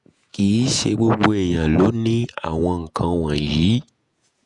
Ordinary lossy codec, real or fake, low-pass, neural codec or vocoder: none; fake; 10.8 kHz; vocoder, 48 kHz, 128 mel bands, Vocos